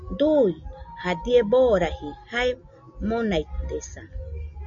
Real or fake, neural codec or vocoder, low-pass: real; none; 7.2 kHz